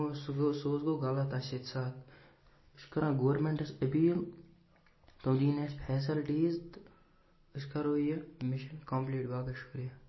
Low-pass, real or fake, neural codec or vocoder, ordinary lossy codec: 7.2 kHz; real; none; MP3, 24 kbps